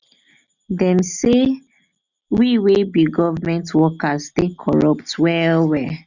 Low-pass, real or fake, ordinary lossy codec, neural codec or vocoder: 7.2 kHz; real; none; none